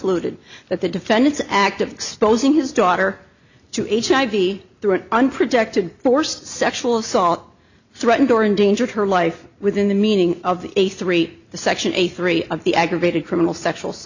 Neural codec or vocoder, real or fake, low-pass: none; real; 7.2 kHz